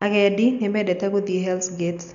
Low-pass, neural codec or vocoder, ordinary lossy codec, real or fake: 7.2 kHz; none; none; real